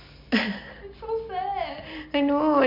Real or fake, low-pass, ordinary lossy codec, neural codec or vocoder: real; 5.4 kHz; MP3, 48 kbps; none